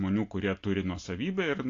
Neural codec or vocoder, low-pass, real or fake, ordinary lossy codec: none; 7.2 kHz; real; AAC, 48 kbps